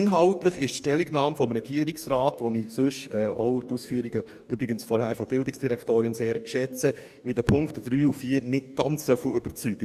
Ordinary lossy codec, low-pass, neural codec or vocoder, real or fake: none; 14.4 kHz; codec, 44.1 kHz, 2.6 kbps, DAC; fake